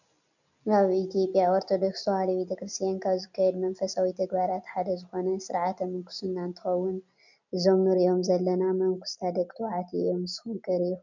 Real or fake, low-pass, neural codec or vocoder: real; 7.2 kHz; none